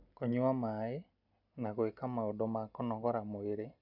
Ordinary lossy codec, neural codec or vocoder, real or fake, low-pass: none; none; real; 5.4 kHz